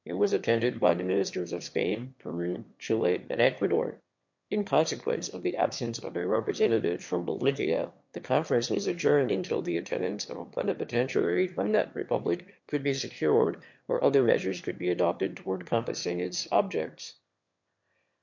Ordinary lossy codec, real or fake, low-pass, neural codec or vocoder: MP3, 48 kbps; fake; 7.2 kHz; autoencoder, 22.05 kHz, a latent of 192 numbers a frame, VITS, trained on one speaker